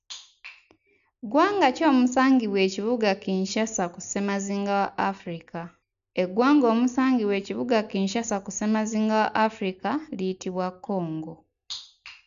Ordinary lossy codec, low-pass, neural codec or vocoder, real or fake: none; 7.2 kHz; none; real